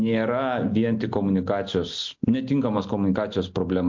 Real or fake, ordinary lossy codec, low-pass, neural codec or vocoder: real; MP3, 48 kbps; 7.2 kHz; none